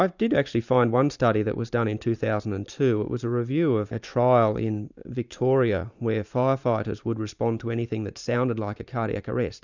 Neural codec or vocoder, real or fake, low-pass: vocoder, 44.1 kHz, 128 mel bands every 512 samples, BigVGAN v2; fake; 7.2 kHz